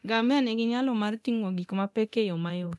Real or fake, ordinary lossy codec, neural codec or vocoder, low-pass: fake; none; codec, 24 kHz, 0.9 kbps, DualCodec; none